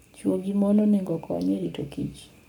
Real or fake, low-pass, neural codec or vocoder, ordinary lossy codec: fake; 19.8 kHz; codec, 44.1 kHz, 7.8 kbps, Pupu-Codec; none